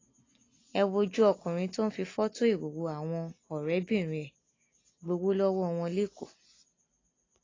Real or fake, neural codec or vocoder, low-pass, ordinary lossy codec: real; none; 7.2 kHz; AAC, 32 kbps